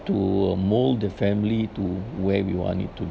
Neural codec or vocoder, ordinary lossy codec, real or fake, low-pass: none; none; real; none